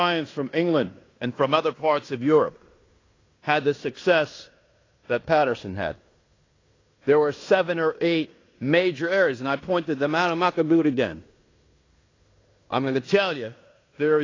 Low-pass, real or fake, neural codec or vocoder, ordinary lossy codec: 7.2 kHz; fake; codec, 16 kHz in and 24 kHz out, 0.9 kbps, LongCat-Audio-Codec, fine tuned four codebook decoder; AAC, 32 kbps